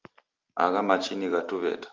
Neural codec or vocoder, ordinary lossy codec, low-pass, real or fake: none; Opus, 32 kbps; 7.2 kHz; real